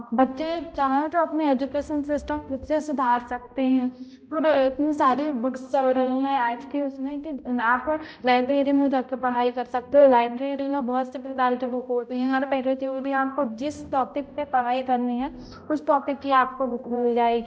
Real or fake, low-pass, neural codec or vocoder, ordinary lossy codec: fake; none; codec, 16 kHz, 0.5 kbps, X-Codec, HuBERT features, trained on balanced general audio; none